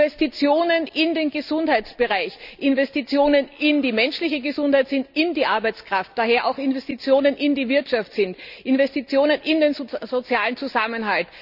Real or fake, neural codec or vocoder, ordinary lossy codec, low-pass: real; none; none; 5.4 kHz